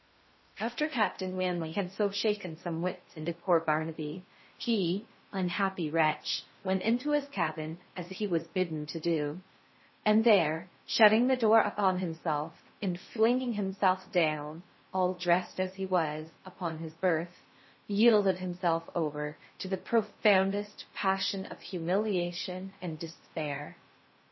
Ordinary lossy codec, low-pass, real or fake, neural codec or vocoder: MP3, 24 kbps; 7.2 kHz; fake; codec, 16 kHz in and 24 kHz out, 0.6 kbps, FocalCodec, streaming, 2048 codes